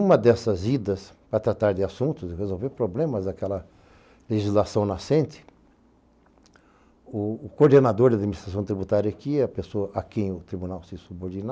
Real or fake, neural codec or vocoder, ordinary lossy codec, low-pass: real; none; none; none